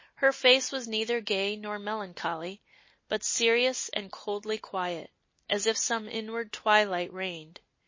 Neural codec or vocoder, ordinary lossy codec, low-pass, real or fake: none; MP3, 32 kbps; 7.2 kHz; real